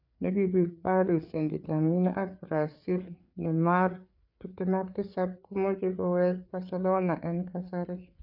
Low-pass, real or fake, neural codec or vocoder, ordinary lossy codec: 5.4 kHz; fake; codec, 16 kHz, 4 kbps, FreqCodec, larger model; none